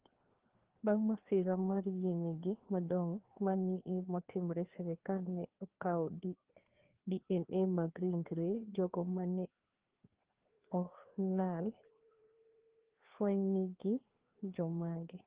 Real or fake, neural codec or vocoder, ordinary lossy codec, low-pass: fake; codec, 16 kHz, 2 kbps, FreqCodec, larger model; Opus, 16 kbps; 3.6 kHz